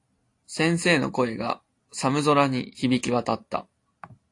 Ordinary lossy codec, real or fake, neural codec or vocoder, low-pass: AAC, 48 kbps; real; none; 10.8 kHz